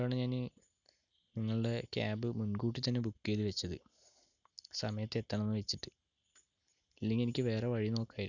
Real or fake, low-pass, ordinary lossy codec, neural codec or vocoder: real; 7.2 kHz; none; none